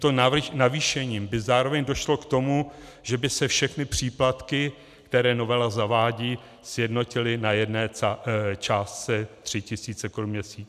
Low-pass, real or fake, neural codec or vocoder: 14.4 kHz; real; none